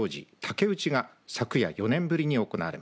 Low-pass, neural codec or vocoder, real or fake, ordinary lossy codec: none; none; real; none